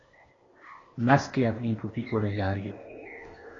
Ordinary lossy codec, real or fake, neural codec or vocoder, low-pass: AAC, 32 kbps; fake; codec, 16 kHz, 0.8 kbps, ZipCodec; 7.2 kHz